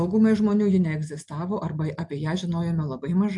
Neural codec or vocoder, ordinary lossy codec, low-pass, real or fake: none; MP3, 64 kbps; 10.8 kHz; real